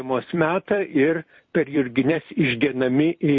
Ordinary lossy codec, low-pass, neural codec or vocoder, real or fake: MP3, 32 kbps; 7.2 kHz; vocoder, 44.1 kHz, 128 mel bands every 256 samples, BigVGAN v2; fake